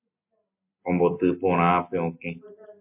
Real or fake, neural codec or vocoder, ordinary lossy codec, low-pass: real; none; MP3, 32 kbps; 3.6 kHz